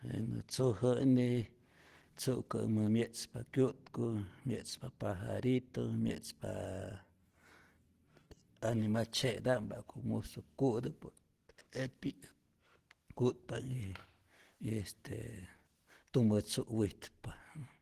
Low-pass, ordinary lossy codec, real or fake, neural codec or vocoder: 14.4 kHz; Opus, 16 kbps; real; none